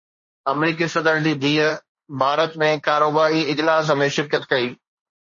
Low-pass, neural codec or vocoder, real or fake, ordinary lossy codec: 7.2 kHz; codec, 16 kHz, 1.1 kbps, Voila-Tokenizer; fake; MP3, 32 kbps